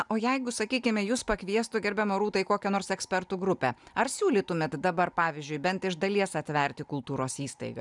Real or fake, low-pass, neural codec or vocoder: real; 10.8 kHz; none